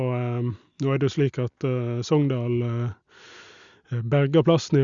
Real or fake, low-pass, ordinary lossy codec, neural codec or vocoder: real; 7.2 kHz; Opus, 64 kbps; none